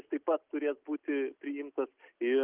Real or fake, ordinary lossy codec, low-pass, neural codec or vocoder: real; Opus, 24 kbps; 3.6 kHz; none